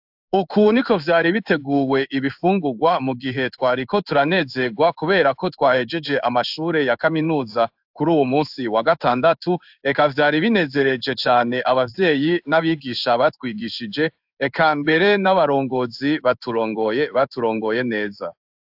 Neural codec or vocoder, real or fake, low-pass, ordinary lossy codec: codec, 16 kHz in and 24 kHz out, 1 kbps, XY-Tokenizer; fake; 5.4 kHz; AAC, 48 kbps